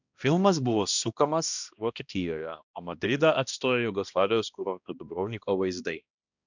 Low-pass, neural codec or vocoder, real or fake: 7.2 kHz; codec, 16 kHz, 1 kbps, X-Codec, HuBERT features, trained on balanced general audio; fake